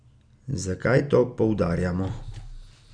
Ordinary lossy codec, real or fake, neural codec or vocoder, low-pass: none; real; none; 9.9 kHz